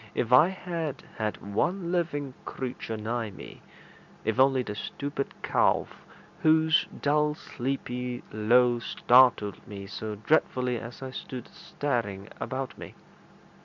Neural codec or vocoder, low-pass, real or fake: none; 7.2 kHz; real